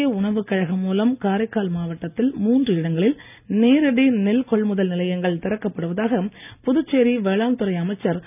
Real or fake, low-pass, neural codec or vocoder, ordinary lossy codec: real; 3.6 kHz; none; none